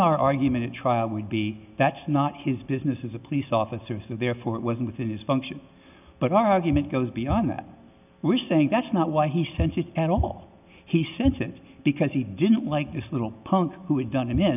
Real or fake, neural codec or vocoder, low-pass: real; none; 3.6 kHz